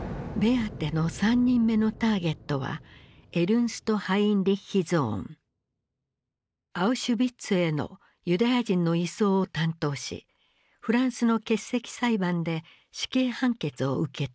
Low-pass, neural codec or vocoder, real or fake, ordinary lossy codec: none; none; real; none